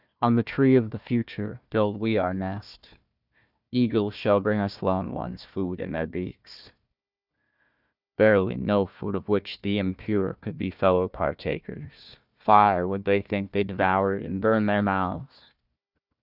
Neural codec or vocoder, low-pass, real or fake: codec, 16 kHz, 1 kbps, FunCodec, trained on Chinese and English, 50 frames a second; 5.4 kHz; fake